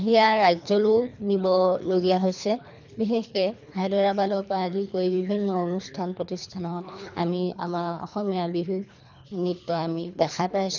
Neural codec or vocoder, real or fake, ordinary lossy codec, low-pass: codec, 24 kHz, 3 kbps, HILCodec; fake; none; 7.2 kHz